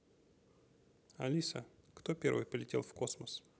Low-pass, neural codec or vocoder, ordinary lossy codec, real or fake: none; none; none; real